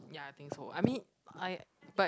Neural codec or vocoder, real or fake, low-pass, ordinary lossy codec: none; real; none; none